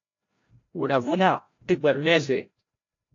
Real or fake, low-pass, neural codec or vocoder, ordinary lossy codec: fake; 7.2 kHz; codec, 16 kHz, 0.5 kbps, FreqCodec, larger model; AAC, 48 kbps